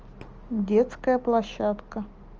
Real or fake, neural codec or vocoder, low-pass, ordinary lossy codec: real; none; 7.2 kHz; Opus, 24 kbps